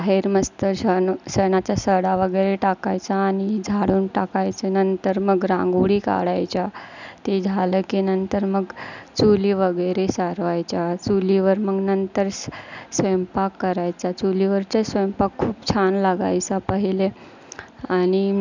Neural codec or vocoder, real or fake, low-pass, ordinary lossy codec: none; real; 7.2 kHz; none